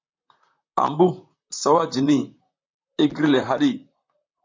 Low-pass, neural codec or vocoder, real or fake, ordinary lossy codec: 7.2 kHz; vocoder, 44.1 kHz, 128 mel bands, Pupu-Vocoder; fake; MP3, 64 kbps